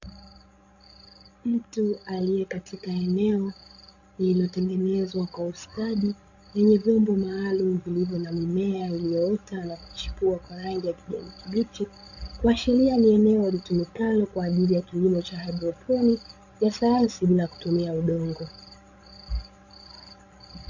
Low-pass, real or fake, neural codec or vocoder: 7.2 kHz; fake; codec, 16 kHz, 16 kbps, FreqCodec, larger model